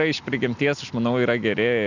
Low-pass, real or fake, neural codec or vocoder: 7.2 kHz; real; none